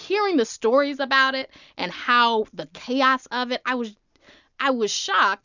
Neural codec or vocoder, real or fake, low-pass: none; real; 7.2 kHz